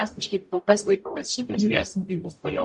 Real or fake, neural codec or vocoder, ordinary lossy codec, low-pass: fake; codec, 44.1 kHz, 0.9 kbps, DAC; MP3, 64 kbps; 10.8 kHz